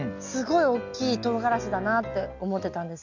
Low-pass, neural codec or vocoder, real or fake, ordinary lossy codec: 7.2 kHz; none; real; none